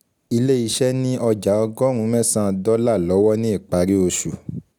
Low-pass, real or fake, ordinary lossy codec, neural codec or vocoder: none; real; none; none